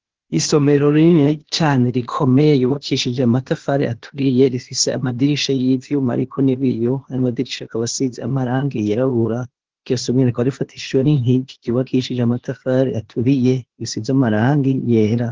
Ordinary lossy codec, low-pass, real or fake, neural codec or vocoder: Opus, 16 kbps; 7.2 kHz; fake; codec, 16 kHz, 0.8 kbps, ZipCodec